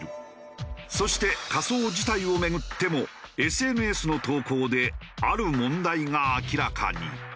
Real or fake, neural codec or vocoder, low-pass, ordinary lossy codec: real; none; none; none